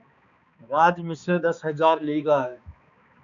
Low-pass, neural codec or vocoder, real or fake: 7.2 kHz; codec, 16 kHz, 2 kbps, X-Codec, HuBERT features, trained on balanced general audio; fake